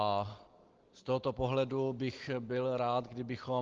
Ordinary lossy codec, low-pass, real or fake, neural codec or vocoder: Opus, 16 kbps; 7.2 kHz; real; none